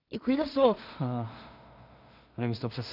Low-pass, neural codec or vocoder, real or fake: 5.4 kHz; codec, 16 kHz in and 24 kHz out, 0.4 kbps, LongCat-Audio-Codec, two codebook decoder; fake